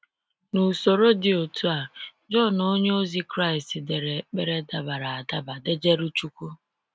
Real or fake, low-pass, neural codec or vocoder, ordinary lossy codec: real; none; none; none